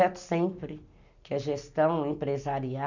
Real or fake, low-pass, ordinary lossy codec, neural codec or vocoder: real; 7.2 kHz; none; none